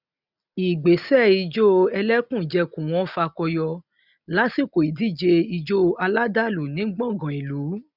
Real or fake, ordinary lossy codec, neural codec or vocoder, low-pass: real; none; none; 5.4 kHz